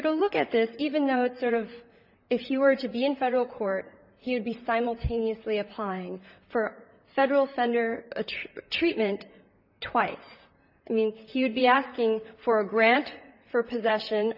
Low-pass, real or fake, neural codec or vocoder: 5.4 kHz; fake; vocoder, 44.1 kHz, 128 mel bands, Pupu-Vocoder